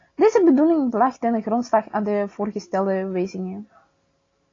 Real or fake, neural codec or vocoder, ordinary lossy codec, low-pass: real; none; AAC, 32 kbps; 7.2 kHz